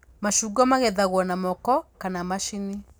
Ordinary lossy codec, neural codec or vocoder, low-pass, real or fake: none; none; none; real